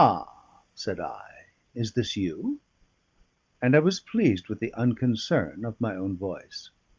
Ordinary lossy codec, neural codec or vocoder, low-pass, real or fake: Opus, 32 kbps; none; 7.2 kHz; real